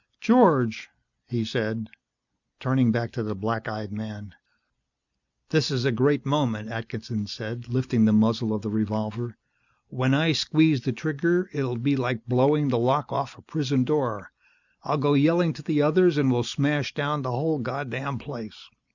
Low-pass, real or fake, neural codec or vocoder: 7.2 kHz; real; none